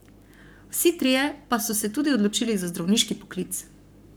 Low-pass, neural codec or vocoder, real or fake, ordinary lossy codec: none; codec, 44.1 kHz, 7.8 kbps, Pupu-Codec; fake; none